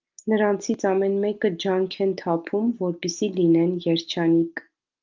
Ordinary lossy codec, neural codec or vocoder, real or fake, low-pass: Opus, 32 kbps; none; real; 7.2 kHz